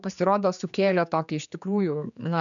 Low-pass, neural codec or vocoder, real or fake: 7.2 kHz; codec, 16 kHz, 4 kbps, X-Codec, HuBERT features, trained on general audio; fake